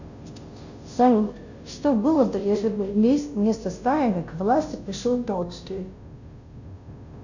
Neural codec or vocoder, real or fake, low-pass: codec, 16 kHz, 0.5 kbps, FunCodec, trained on Chinese and English, 25 frames a second; fake; 7.2 kHz